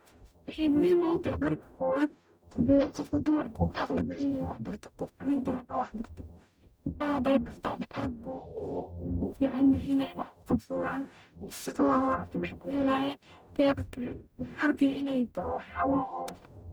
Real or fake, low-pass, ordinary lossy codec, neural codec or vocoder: fake; none; none; codec, 44.1 kHz, 0.9 kbps, DAC